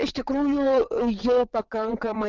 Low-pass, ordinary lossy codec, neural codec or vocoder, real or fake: 7.2 kHz; Opus, 24 kbps; vocoder, 22.05 kHz, 80 mel bands, WaveNeXt; fake